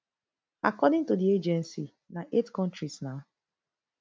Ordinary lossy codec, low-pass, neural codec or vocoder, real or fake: none; none; none; real